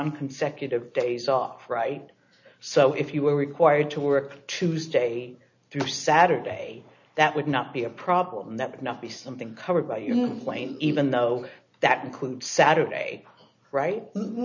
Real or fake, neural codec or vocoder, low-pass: real; none; 7.2 kHz